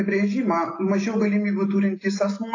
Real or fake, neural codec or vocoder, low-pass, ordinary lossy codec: real; none; 7.2 kHz; AAC, 32 kbps